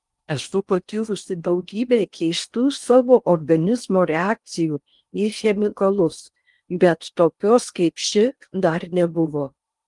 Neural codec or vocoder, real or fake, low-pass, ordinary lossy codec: codec, 16 kHz in and 24 kHz out, 0.6 kbps, FocalCodec, streaming, 4096 codes; fake; 10.8 kHz; Opus, 32 kbps